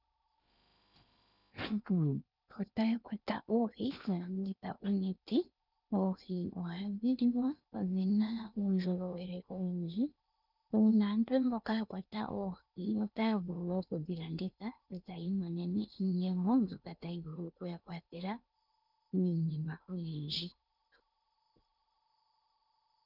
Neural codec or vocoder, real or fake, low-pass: codec, 16 kHz in and 24 kHz out, 0.8 kbps, FocalCodec, streaming, 65536 codes; fake; 5.4 kHz